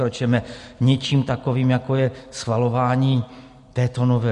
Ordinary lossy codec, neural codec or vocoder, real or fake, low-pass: MP3, 48 kbps; none; real; 14.4 kHz